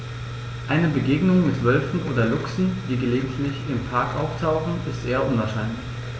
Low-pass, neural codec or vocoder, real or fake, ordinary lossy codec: none; none; real; none